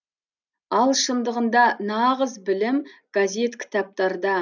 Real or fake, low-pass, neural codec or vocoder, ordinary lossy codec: real; 7.2 kHz; none; none